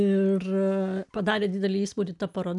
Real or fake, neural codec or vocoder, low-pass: real; none; 10.8 kHz